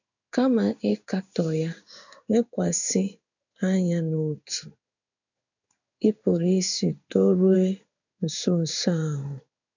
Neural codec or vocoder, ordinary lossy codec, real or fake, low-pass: codec, 16 kHz in and 24 kHz out, 1 kbps, XY-Tokenizer; none; fake; 7.2 kHz